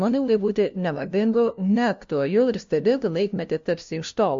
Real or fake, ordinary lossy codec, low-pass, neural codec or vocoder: fake; MP3, 48 kbps; 7.2 kHz; codec, 16 kHz, 0.5 kbps, FunCodec, trained on LibriTTS, 25 frames a second